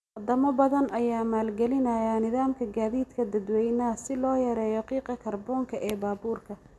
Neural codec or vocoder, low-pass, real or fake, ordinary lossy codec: none; none; real; none